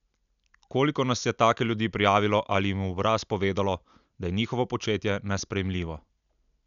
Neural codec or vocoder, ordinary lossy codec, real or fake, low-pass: none; none; real; 7.2 kHz